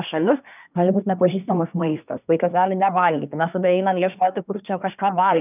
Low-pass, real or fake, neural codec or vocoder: 3.6 kHz; fake; codec, 24 kHz, 1 kbps, SNAC